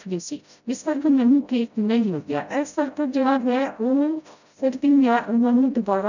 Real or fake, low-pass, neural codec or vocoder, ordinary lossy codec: fake; 7.2 kHz; codec, 16 kHz, 0.5 kbps, FreqCodec, smaller model; none